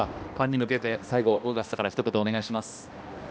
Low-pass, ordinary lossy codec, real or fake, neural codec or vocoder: none; none; fake; codec, 16 kHz, 1 kbps, X-Codec, HuBERT features, trained on balanced general audio